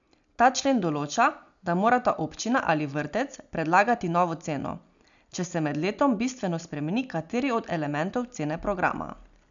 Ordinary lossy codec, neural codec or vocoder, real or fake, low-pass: none; none; real; 7.2 kHz